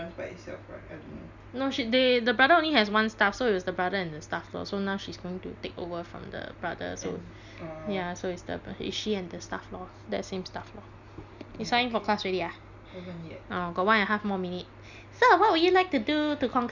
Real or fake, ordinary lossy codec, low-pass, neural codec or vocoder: real; Opus, 64 kbps; 7.2 kHz; none